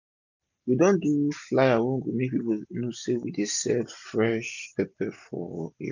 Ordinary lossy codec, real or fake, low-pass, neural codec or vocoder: none; real; 7.2 kHz; none